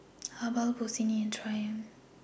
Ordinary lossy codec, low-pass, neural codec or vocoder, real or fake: none; none; none; real